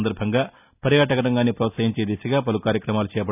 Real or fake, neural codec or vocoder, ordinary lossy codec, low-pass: real; none; none; 3.6 kHz